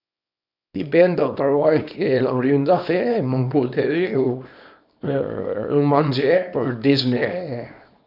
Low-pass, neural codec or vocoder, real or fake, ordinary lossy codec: 5.4 kHz; codec, 24 kHz, 0.9 kbps, WavTokenizer, small release; fake; none